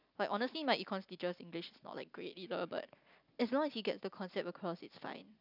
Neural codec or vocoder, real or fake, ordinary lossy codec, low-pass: none; real; none; 5.4 kHz